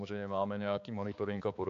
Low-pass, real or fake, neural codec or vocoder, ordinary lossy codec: 7.2 kHz; fake; codec, 16 kHz, 4 kbps, X-Codec, HuBERT features, trained on balanced general audio; AAC, 64 kbps